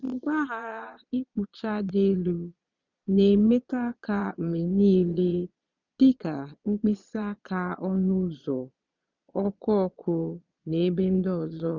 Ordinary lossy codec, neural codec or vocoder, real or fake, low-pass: none; vocoder, 22.05 kHz, 80 mel bands, Vocos; fake; 7.2 kHz